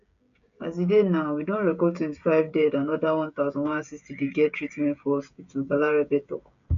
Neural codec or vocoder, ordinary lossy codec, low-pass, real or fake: codec, 16 kHz, 16 kbps, FreqCodec, smaller model; none; 7.2 kHz; fake